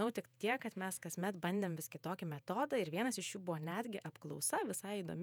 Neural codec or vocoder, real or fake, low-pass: vocoder, 48 kHz, 128 mel bands, Vocos; fake; 19.8 kHz